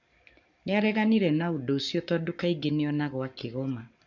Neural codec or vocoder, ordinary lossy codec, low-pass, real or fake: codec, 44.1 kHz, 7.8 kbps, Pupu-Codec; none; 7.2 kHz; fake